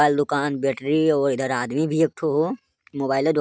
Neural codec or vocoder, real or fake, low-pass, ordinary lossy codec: none; real; none; none